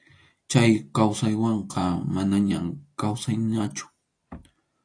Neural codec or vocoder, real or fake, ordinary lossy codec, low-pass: none; real; AAC, 48 kbps; 9.9 kHz